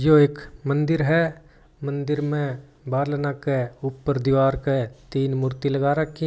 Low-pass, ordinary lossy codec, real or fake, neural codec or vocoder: none; none; real; none